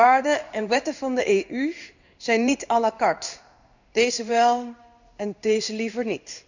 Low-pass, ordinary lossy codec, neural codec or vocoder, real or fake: 7.2 kHz; none; codec, 16 kHz in and 24 kHz out, 1 kbps, XY-Tokenizer; fake